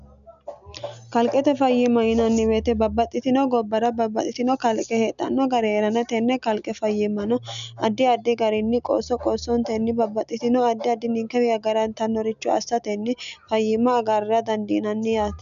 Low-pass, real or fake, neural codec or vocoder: 7.2 kHz; real; none